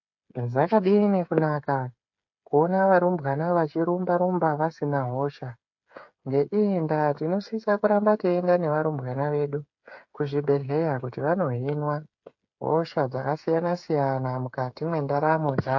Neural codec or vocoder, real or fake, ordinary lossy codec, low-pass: codec, 16 kHz, 8 kbps, FreqCodec, smaller model; fake; AAC, 48 kbps; 7.2 kHz